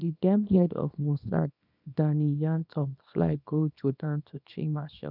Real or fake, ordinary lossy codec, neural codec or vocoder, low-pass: fake; none; codec, 24 kHz, 0.9 kbps, WavTokenizer, small release; 5.4 kHz